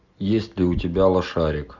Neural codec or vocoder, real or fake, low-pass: none; real; 7.2 kHz